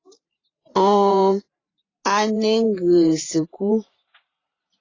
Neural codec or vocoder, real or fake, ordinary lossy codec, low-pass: vocoder, 44.1 kHz, 128 mel bands every 512 samples, BigVGAN v2; fake; AAC, 32 kbps; 7.2 kHz